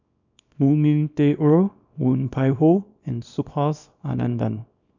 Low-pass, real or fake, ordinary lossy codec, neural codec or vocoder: 7.2 kHz; fake; none; codec, 24 kHz, 0.9 kbps, WavTokenizer, small release